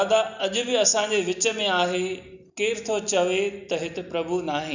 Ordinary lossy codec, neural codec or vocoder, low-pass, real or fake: none; none; 7.2 kHz; real